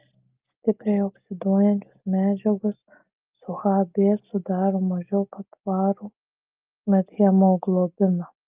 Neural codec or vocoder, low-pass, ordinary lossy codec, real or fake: none; 3.6 kHz; Opus, 32 kbps; real